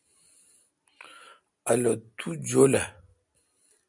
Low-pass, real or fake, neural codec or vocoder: 10.8 kHz; real; none